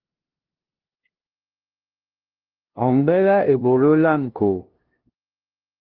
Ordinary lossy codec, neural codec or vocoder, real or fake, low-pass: Opus, 16 kbps; codec, 16 kHz, 0.5 kbps, FunCodec, trained on LibriTTS, 25 frames a second; fake; 5.4 kHz